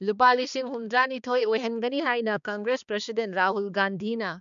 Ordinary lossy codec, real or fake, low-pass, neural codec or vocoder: none; fake; 7.2 kHz; codec, 16 kHz, 2 kbps, X-Codec, HuBERT features, trained on balanced general audio